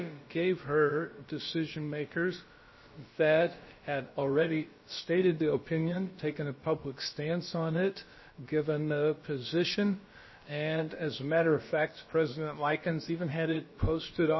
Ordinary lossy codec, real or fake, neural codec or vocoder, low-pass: MP3, 24 kbps; fake; codec, 16 kHz, about 1 kbps, DyCAST, with the encoder's durations; 7.2 kHz